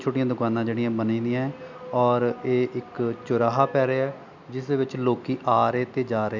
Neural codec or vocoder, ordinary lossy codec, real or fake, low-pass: none; none; real; 7.2 kHz